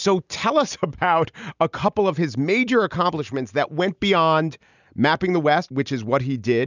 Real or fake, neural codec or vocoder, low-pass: real; none; 7.2 kHz